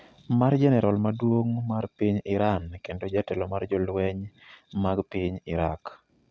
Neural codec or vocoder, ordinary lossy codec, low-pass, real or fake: none; none; none; real